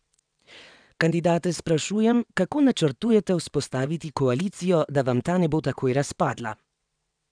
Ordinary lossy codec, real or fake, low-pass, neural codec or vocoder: none; fake; 9.9 kHz; vocoder, 22.05 kHz, 80 mel bands, WaveNeXt